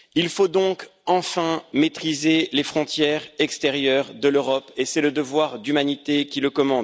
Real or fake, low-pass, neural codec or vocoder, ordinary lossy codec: real; none; none; none